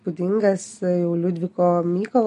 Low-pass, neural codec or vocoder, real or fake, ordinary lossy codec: 10.8 kHz; none; real; MP3, 48 kbps